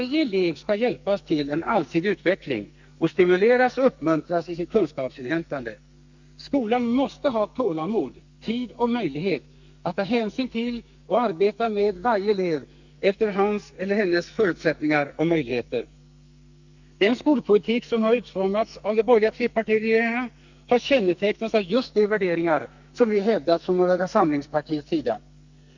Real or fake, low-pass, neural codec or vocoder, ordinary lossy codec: fake; 7.2 kHz; codec, 44.1 kHz, 2.6 kbps, SNAC; Opus, 64 kbps